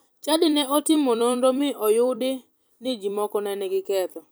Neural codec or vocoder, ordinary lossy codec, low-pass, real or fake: vocoder, 44.1 kHz, 128 mel bands every 256 samples, BigVGAN v2; none; none; fake